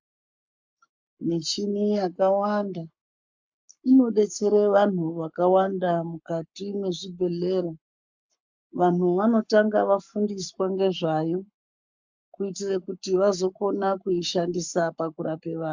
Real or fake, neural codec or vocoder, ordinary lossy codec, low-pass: fake; codec, 44.1 kHz, 7.8 kbps, Pupu-Codec; MP3, 64 kbps; 7.2 kHz